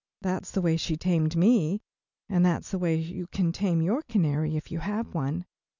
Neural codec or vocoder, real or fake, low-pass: none; real; 7.2 kHz